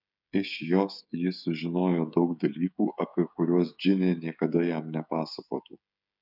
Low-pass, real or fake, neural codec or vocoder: 5.4 kHz; fake; codec, 16 kHz, 16 kbps, FreqCodec, smaller model